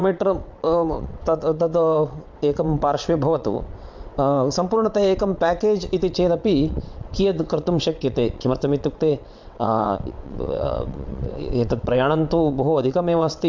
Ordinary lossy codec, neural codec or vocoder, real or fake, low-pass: MP3, 64 kbps; vocoder, 22.05 kHz, 80 mel bands, WaveNeXt; fake; 7.2 kHz